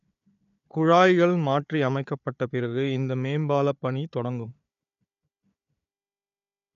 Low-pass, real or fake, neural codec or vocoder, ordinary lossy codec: 7.2 kHz; fake; codec, 16 kHz, 4 kbps, FunCodec, trained on Chinese and English, 50 frames a second; none